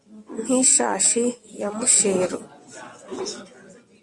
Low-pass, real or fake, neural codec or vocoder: 10.8 kHz; fake; vocoder, 24 kHz, 100 mel bands, Vocos